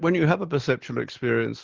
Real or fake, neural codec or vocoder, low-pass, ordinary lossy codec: real; none; 7.2 kHz; Opus, 16 kbps